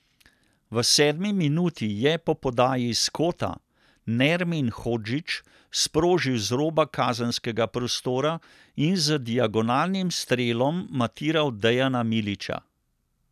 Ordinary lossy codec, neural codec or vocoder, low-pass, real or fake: none; none; 14.4 kHz; real